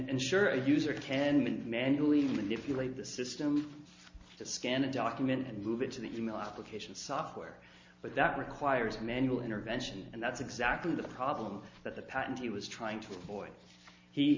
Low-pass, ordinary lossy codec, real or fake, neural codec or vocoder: 7.2 kHz; MP3, 48 kbps; real; none